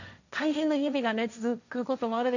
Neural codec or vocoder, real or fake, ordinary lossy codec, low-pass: codec, 16 kHz, 1.1 kbps, Voila-Tokenizer; fake; none; 7.2 kHz